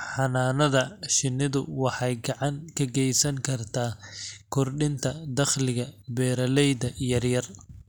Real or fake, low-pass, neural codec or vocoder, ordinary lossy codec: real; none; none; none